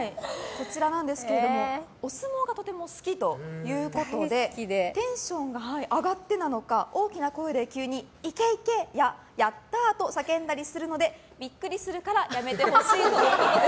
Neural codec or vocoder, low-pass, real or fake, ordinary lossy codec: none; none; real; none